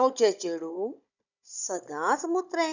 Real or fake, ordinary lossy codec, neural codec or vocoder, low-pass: fake; none; codec, 16 kHz, 16 kbps, FunCodec, trained on Chinese and English, 50 frames a second; 7.2 kHz